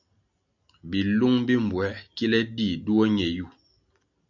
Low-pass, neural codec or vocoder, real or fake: 7.2 kHz; none; real